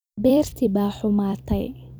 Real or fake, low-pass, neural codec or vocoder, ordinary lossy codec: fake; none; vocoder, 44.1 kHz, 128 mel bands every 256 samples, BigVGAN v2; none